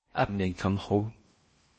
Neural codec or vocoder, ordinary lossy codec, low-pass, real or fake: codec, 16 kHz in and 24 kHz out, 0.6 kbps, FocalCodec, streaming, 4096 codes; MP3, 32 kbps; 9.9 kHz; fake